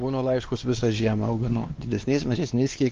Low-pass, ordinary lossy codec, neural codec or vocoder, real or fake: 7.2 kHz; Opus, 32 kbps; codec, 16 kHz, 2 kbps, X-Codec, WavLM features, trained on Multilingual LibriSpeech; fake